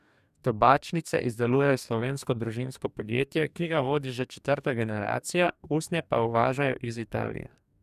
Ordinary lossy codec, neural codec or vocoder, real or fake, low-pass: none; codec, 44.1 kHz, 2.6 kbps, DAC; fake; 14.4 kHz